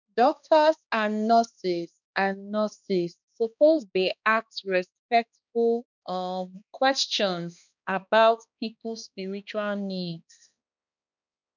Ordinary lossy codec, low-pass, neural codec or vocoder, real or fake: none; 7.2 kHz; codec, 16 kHz, 2 kbps, X-Codec, HuBERT features, trained on balanced general audio; fake